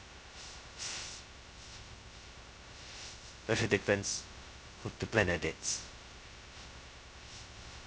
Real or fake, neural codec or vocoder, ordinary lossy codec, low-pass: fake; codec, 16 kHz, 0.2 kbps, FocalCodec; none; none